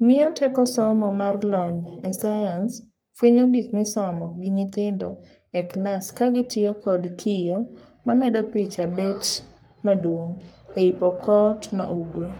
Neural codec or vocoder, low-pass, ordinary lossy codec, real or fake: codec, 44.1 kHz, 3.4 kbps, Pupu-Codec; none; none; fake